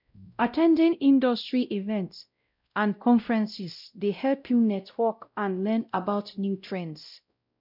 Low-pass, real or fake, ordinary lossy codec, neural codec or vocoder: 5.4 kHz; fake; none; codec, 16 kHz, 0.5 kbps, X-Codec, WavLM features, trained on Multilingual LibriSpeech